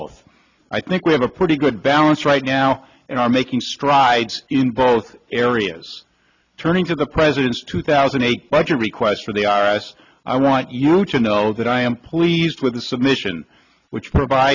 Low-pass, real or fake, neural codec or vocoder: 7.2 kHz; real; none